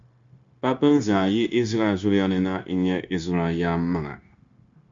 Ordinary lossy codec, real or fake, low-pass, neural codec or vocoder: Opus, 64 kbps; fake; 7.2 kHz; codec, 16 kHz, 0.9 kbps, LongCat-Audio-Codec